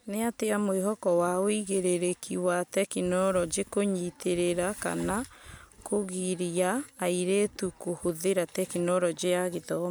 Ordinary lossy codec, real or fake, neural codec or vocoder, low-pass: none; real; none; none